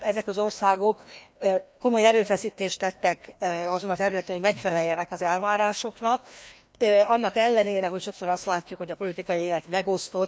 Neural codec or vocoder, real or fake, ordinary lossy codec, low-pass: codec, 16 kHz, 1 kbps, FreqCodec, larger model; fake; none; none